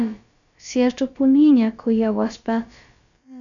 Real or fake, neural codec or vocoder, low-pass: fake; codec, 16 kHz, about 1 kbps, DyCAST, with the encoder's durations; 7.2 kHz